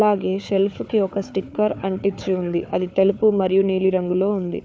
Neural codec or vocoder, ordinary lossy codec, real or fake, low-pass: codec, 16 kHz, 4 kbps, FunCodec, trained on Chinese and English, 50 frames a second; none; fake; none